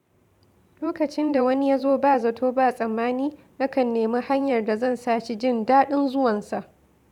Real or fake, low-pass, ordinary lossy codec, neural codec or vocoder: fake; 19.8 kHz; none; vocoder, 44.1 kHz, 128 mel bands every 512 samples, BigVGAN v2